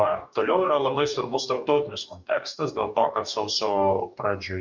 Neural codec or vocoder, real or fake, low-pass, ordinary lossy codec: codec, 44.1 kHz, 2.6 kbps, DAC; fake; 7.2 kHz; MP3, 64 kbps